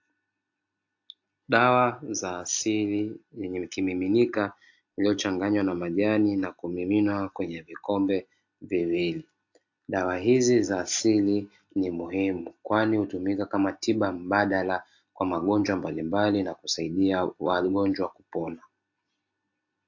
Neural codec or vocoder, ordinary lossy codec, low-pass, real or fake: none; AAC, 48 kbps; 7.2 kHz; real